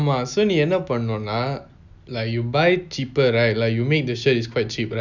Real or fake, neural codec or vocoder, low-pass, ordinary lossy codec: real; none; 7.2 kHz; none